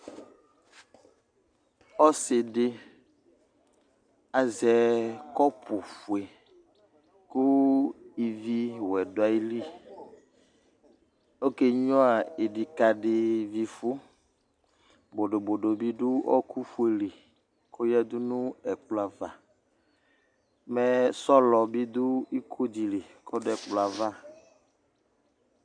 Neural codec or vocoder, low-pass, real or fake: none; 9.9 kHz; real